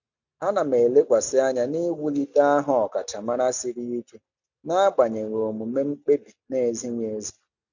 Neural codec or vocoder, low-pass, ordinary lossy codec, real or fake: none; 7.2 kHz; none; real